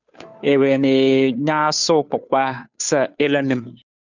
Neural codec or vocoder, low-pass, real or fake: codec, 16 kHz, 2 kbps, FunCodec, trained on Chinese and English, 25 frames a second; 7.2 kHz; fake